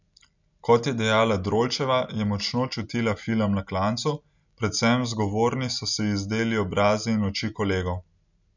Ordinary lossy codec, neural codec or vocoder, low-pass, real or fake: none; none; 7.2 kHz; real